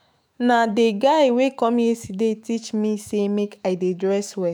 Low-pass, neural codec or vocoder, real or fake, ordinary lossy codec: none; autoencoder, 48 kHz, 128 numbers a frame, DAC-VAE, trained on Japanese speech; fake; none